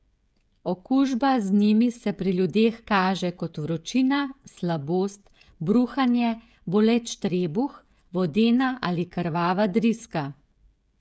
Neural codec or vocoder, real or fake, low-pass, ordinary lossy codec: codec, 16 kHz, 16 kbps, FreqCodec, smaller model; fake; none; none